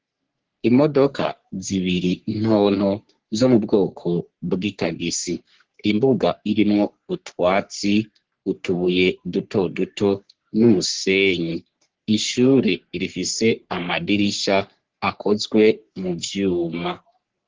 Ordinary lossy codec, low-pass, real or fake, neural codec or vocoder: Opus, 16 kbps; 7.2 kHz; fake; codec, 44.1 kHz, 3.4 kbps, Pupu-Codec